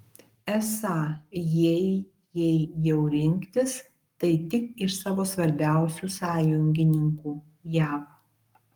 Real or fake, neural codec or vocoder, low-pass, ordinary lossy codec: fake; codec, 44.1 kHz, 7.8 kbps, Pupu-Codec; 19.8 kHz; Opus, 32 kbps